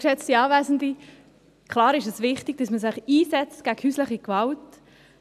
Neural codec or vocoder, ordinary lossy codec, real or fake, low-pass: none; none; real; 14.4 kHz